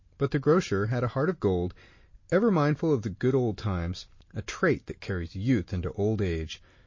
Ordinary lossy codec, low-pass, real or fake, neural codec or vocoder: MP3, 32 kbps; 7.2 kHz; real; none